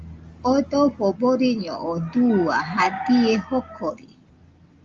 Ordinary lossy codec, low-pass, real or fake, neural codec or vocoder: Opus, 32 kbps; 7.2 kHz; real; none